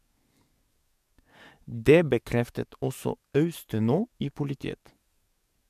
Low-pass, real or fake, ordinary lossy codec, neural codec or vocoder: 14.4 kHz; fake; MP3, 96 kbps; codec, 44.1 kHz, 7.8 kbps, DAC